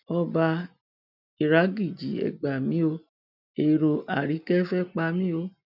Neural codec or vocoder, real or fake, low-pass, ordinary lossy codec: none; real; 5.4 kHz; none